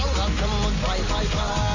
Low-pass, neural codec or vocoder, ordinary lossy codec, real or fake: 7.2 kHz; none; none; real